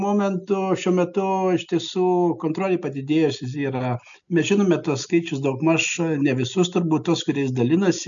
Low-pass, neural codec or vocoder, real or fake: 7.2 kHz; none; real